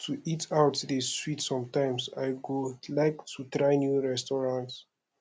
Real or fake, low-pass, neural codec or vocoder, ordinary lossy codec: real; none; none; none